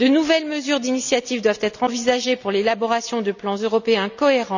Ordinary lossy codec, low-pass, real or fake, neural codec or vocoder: none; 7.2 kHz; real; none